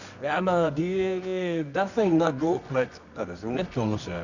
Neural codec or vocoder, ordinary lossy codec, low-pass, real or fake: codec, 24 kHz, 0.9 kbps, WavTokenizer, medium music audio release; none; 7.2 kHz; fake